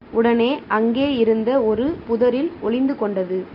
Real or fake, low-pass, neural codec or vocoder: real; 5.4 kHz; none